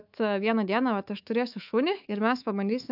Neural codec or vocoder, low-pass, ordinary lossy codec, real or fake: codec, 24 kHz, 3.1 kbps, DualCodec; 5.4 kHz; AAC, 48 kbps; fake